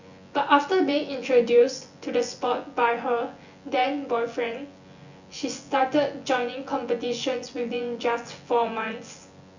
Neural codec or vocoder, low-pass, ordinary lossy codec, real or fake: vocoder, 24 kHz, 100 mel bands, Vocos; 7.2 kHz; Opus, 64 kbps; fake